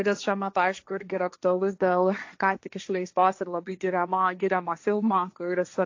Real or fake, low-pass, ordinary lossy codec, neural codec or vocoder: fake; 7.2 kHz; AAC, 48 kbps; codec, 16 kHz, 1.1 kbps, Voila-Tokenizer